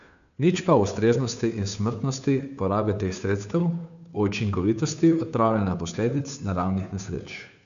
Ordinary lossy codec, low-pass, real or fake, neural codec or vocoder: none; 7.2 kHz; fake; codec, 16 kHz, 2 kbps, FunCodec, trained on Chinese and English, 25 frames a second